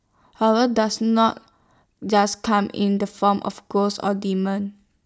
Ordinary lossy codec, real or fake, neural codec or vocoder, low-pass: none; real; none; none